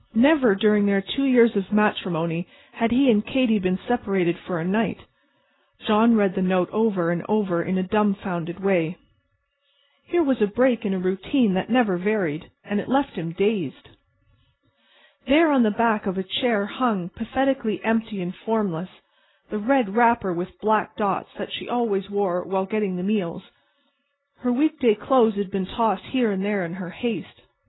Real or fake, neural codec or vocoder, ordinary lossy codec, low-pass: real; none; AAC, 16 kbps; 7.2 kHz